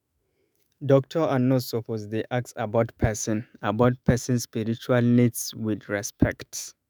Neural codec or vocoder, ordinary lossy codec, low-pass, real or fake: autoencoder, 48 kHz, 128 numbers a frame, DAC-VAE, trained on Japanese speech; none; none; fake